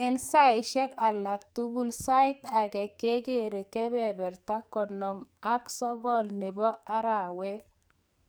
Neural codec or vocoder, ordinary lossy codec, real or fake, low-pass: codec, 44.1 kHz, 2.6 kbps, SNAC; none; fake; none